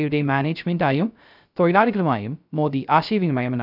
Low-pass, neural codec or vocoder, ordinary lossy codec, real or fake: 5.4 kHz; codec, 16 kHz, 0.3 kbps, FocalCodec; none; fake